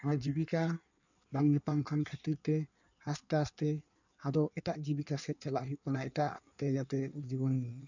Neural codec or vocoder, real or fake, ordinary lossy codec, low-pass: codec, 16 kHz in and 24 kHz out, 1.1 kbps, FireRedTTS-2 codec; fake; none; 7.2 kHz